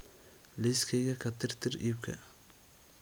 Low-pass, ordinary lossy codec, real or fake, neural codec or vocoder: none; none; real; none